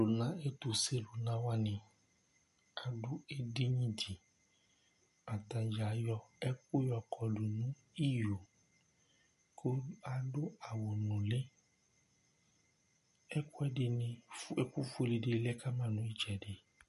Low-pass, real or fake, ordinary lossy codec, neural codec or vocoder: 14.4 kHz; real; MP3, 48 kbps; none